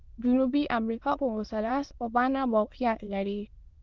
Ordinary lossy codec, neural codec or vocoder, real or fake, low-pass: Opus, 32 kbps; autoencoder, 22.05 kHz, a latent of 192 numbers a frame, VITS, trained on many speakers; fake; 7.2 kHz